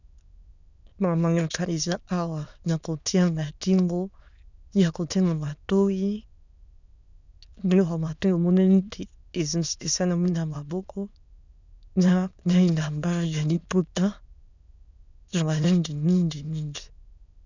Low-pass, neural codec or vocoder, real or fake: 7.2 kHz; autoencoder, 22.05 kHz, a latent of 192 numbers a frame, VITS, trained on many speakers; fake